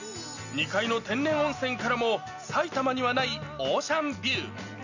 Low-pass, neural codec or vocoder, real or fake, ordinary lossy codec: 7.2 kHz; none; real; none